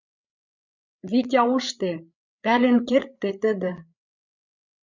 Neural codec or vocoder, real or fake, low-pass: codec, 16 kHz, 8 kbps, FreqCodec, larger model; fake; 7.2 kHz